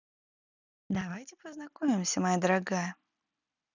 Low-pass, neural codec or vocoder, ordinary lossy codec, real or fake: 7.2 kHz; none; none; real